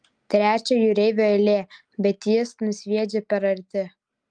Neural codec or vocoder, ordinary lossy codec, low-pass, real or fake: none; Opus, 32 kbps; 9.9 kHz; real